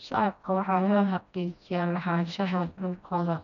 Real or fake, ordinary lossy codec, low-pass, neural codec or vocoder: fake; none; 7.2 kHz; codec, 16 kHz, 1 kbps, FreqCodec, smaller model